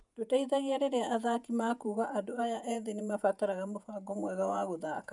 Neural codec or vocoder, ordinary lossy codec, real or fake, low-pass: vocoder, 48 kHz, 128 mel bands, Vocos; none; fake; 10.8 kHz